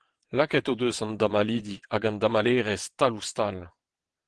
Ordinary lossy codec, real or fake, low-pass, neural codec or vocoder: Opus, 16 kbps; fake; 9.9 kHz; vocoder, 22.05 kHz, 80 mel bands, WaveNeXt